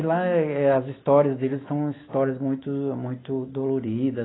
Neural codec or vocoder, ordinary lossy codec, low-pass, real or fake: none; AAC, 16 kbps; 7.2 kHz; real